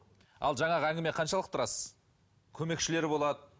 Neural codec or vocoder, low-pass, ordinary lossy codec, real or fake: none; none; none; real